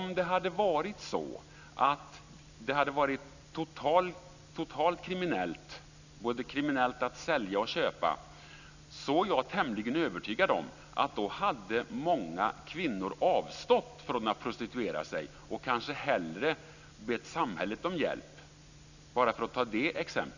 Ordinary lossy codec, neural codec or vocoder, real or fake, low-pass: none; none; real; 7.2 kHz